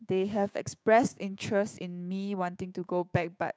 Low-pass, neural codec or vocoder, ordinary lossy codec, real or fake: none; codec, 16 kHz, 6 kbps, DAC; none; fake